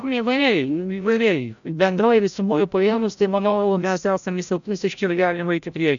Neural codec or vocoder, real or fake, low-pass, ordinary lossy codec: codec, 16 kHz, 0.5 kbps, FreqCodec, larger model; fake; 7.2 kHz; MP3, 96 kbps